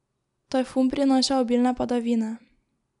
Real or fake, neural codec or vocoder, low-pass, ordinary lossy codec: real; none; 10.8 kHz; none